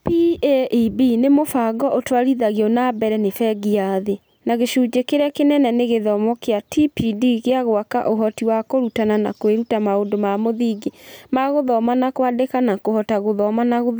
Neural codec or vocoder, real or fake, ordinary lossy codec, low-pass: none; real; none; none